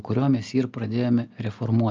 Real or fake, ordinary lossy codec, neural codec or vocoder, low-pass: real; Opus, 24 kbps; none; 7.2 kHz